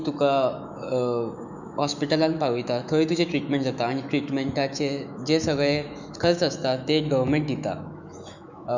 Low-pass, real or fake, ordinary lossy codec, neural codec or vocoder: 7.2 kHz; fake; none; autoencoder, 48 kHz, 128 numbers a frame, DAC-VAE, trained on Japanese speech